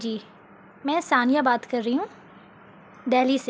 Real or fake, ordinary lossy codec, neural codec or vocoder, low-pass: real; none; none; none